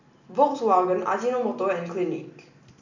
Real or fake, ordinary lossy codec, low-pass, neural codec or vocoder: real; none; 7.2 kHz; none